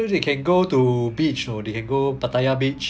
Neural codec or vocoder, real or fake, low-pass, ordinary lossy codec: none; real; none; none